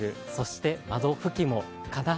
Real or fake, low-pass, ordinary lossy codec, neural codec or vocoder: real; none; none; none